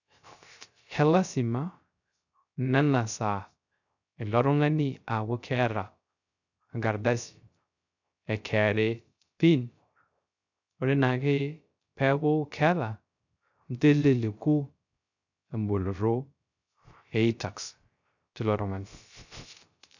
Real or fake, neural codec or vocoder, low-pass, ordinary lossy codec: fake; codec, 16 kHz, 0.3 kbps, FocalCodec; 7.2 kHz; none